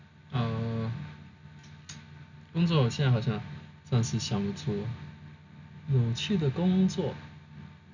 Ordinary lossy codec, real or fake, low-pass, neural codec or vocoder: none; real; 7.2 kHz; none